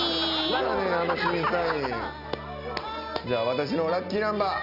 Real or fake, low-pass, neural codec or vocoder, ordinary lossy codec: real; 5.4 kHz; none; none